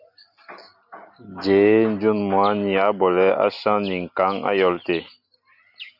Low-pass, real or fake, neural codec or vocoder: 5.4 kHz; real; none